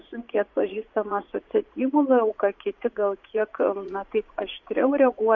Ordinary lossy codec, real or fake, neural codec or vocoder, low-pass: MP3, 48 kbps; fake; vocoder, 44.1 kHz, 80 mel bands, Vocos; 7.2 kHz